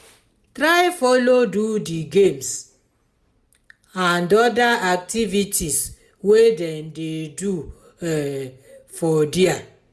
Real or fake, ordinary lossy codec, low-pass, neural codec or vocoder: real; none; none; none